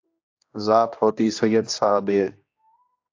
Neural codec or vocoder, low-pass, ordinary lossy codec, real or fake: codec, 16 kHz, 1 kbps, X-Codec, HuBERT features, trained on general audio; 7.2 kHz; AAC, 48 kbps; fake